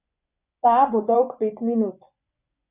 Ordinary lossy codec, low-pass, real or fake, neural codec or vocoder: none; 3.6 kHz; fake; vocoder, 24 kHz, 100 mel bands, Vocos